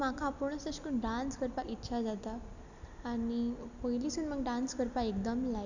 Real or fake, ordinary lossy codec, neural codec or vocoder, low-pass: real; none; none; 7.2 kHz